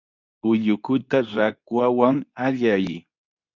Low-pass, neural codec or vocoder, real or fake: 7.2 kHz; codec, 24 kHz, 0.9 kbps, WavTokenizer, medium speech release version 2; fake